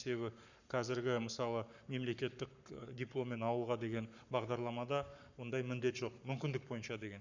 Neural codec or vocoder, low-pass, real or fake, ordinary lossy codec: codec, 44.1 kHz, 7.8 kbps, Pupu-Codec; 7.2 kHz; fake; none